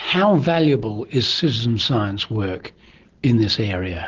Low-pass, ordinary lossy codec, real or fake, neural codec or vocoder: 7.2 kHz; Opus, 16 kbps; real; none